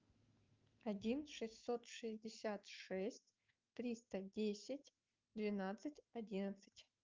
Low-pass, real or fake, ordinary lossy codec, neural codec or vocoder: 7.2 kHz; fake; Opus, 24 kbps; autoencoder, 48 kHz, 128 numbers a frame, DAC-VAE, trained on Japanese speech